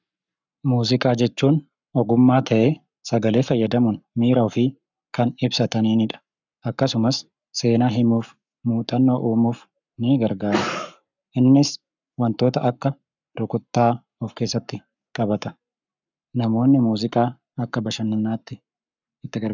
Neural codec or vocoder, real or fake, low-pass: codec, 44.1 kHz, 7.8 kbps, Pupu-Codec; fake; 7.2 kHz